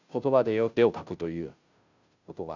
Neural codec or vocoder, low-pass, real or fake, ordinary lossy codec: codec, 16 kHz, 0.5 kbps, FunCodec, trained on Chinese and English, 25 frames a second; 7.2 kHz; fake; none